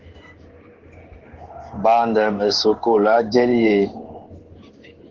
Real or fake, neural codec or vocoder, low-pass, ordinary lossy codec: fake; codec, 16 kHz in and 24 kHz out, 1 kbps, XY-Tokenizer; 7.2 kHz; Opus, 16 kbps